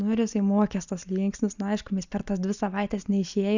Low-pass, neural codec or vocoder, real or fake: 7.2 kHz; none; real